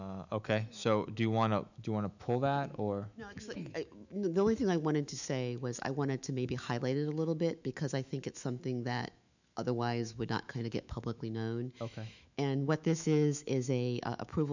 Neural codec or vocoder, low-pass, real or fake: autoencoder, 48 kHz, 128 numbers a frame, DAC-VAE, trained on Japanese speech; 7.2 kHz; fake